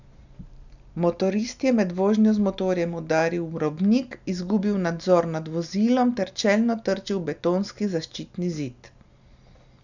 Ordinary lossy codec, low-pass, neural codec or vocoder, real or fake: none; 7.2 kHz; none; real